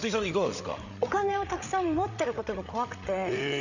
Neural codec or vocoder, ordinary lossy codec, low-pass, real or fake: codec, 16 kHz, 8 kbps, FreqCodec, larger model; none; 7.2 kHz; fake